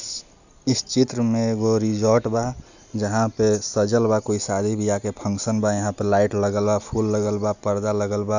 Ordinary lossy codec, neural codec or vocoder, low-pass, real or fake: none; none; 7.2 kHz; real